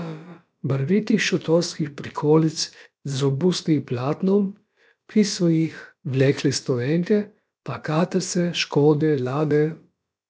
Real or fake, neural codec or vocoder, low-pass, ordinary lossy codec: fake; codec, 16 kHz, about 1 kbps, DyCAST, with the encoder's durations; none; none